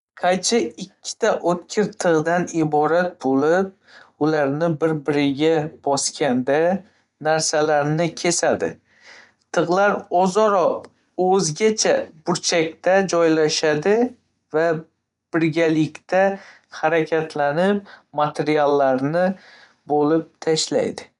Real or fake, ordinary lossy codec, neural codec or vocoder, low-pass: fake; none; vocoder, 24 kHz, 100 mel bands, Vocos; 10.8 kHz